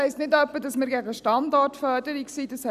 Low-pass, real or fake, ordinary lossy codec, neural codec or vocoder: 14.4 kHz; real; none; none